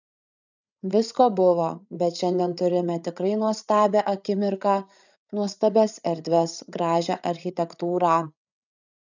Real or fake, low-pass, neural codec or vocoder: fake; 7.2 kHz; codec, 16 kHz, 8 kbps, FreqCodec, larger model